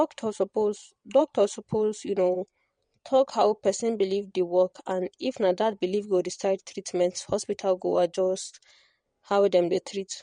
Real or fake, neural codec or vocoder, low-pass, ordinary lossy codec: fake; vocoder, 22.05 kHz, 80 mel bands, WaveNeXt; 9.9 kHz; MP3, 48 kbps